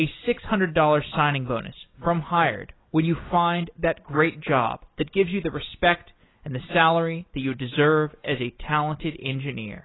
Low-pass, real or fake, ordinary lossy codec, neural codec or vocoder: 7.2 kHz; real; AAC, 16 kbps; none